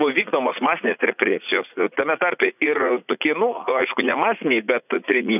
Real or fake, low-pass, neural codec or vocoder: fake; 3.6 kHz; vocoder, 44.1 kHz, 80 mel bands, Vocos